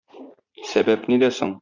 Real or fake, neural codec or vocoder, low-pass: fake; vocoder, 44.1 kHz, 80 mel bands, Vocos; 7.2 kHz